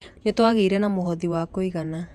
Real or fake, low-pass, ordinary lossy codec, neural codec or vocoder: fake; 14.4 kHz; none; vocoder, 48 kHz, 128 mel bands, Vocos